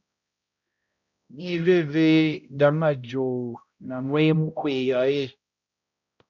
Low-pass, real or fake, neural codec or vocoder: 7.2 kHz; fake; codec, 16 kHz, 0.5 kbps, X-Codec, HuBERT features, trained on balanced general audio